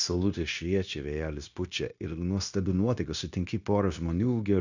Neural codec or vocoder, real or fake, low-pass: codec, 16 kHz, 0.9 kbps, LongCat-Audio-Codec; fake; 7.2 kHz